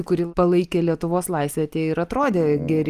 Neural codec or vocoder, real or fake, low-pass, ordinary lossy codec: none; real; 14.4 kHz; Opus, 32 kbps